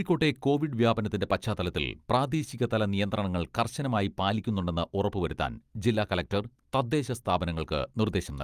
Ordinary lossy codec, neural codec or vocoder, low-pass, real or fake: Opus, 32 kbps; none; 14.4 kHz; real